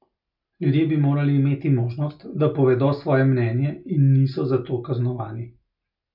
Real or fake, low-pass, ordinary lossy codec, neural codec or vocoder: real; 5.4 kHz; none; none